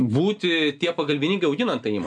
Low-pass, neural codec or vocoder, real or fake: 9.9 kHz; none; real